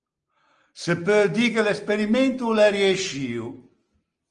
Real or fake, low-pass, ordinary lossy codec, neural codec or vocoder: real; 9.9 kHz; Opus, 24 kbps; none